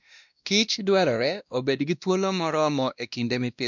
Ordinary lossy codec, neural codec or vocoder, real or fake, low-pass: none; codec, 16 kHz, 1 kbps, X-Codec, WavLM features, trained on Multilingual LibriSpeech; fake; 7.2 kHz